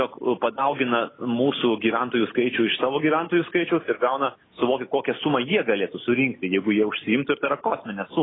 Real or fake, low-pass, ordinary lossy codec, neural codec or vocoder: real; 7.2 kHz; AAC, 16 kbps; none